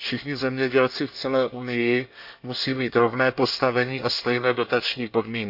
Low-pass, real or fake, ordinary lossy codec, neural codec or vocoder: 5.4 kHz; fake; AAC, 48 kbps; codec, 24 kHz, 1 kbps, SNAC